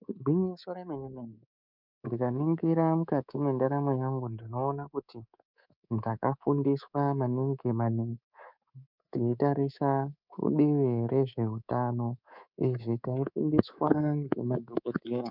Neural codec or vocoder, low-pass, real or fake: codec, 24 kHz, 3.1 kbps, DualCodec; 5.4 kHz; fake